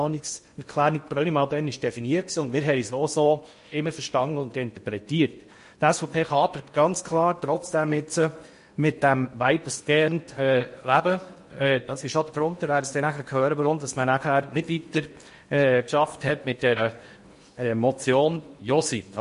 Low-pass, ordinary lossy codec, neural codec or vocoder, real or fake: 10.8 kHz; MP3, 48 kbps; codec, 16 kHz in and 24 kHz out, 0.8 kbps, FocalCodec, streaming, 65536 codes; fake